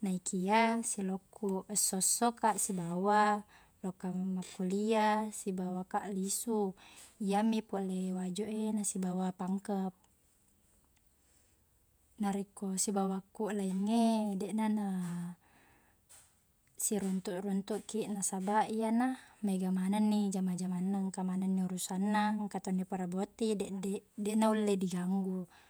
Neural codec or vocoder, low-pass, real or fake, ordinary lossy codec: vocoder, 48 kHz, 128 mel bands, Vocos; none; fake; none